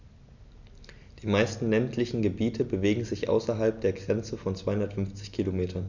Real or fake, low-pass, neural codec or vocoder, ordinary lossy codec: real; 7.2 kHz; none; none